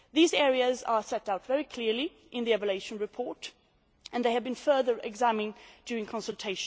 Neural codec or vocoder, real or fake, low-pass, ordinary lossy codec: none; real; none; none